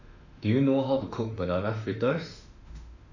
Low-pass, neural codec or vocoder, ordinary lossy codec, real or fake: 7.2 kHz; autoencoder, 48 kHz, 32 numbers a frame, DAC-VAE, trained on Japanese speech; none; fake